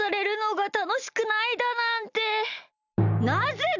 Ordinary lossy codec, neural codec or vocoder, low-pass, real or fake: none; none; 7.2 kHz; real